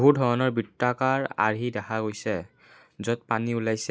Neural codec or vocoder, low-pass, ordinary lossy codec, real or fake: none; none; none; real